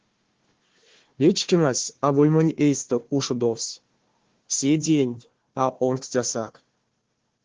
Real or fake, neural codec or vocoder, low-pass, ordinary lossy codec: fake; codec, 16 kHz, 1 kbps, FunCodec, trained on Chinese and English, 50 frames a second; 7.2 kHz; Opus, 16 kbps